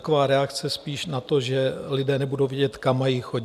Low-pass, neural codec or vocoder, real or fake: 14.4 kHz; none; real